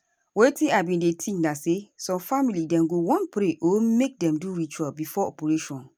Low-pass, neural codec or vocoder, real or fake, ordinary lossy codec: none; none; real; none